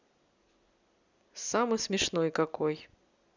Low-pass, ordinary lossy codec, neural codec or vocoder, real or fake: 7.2 kHz; none; none; real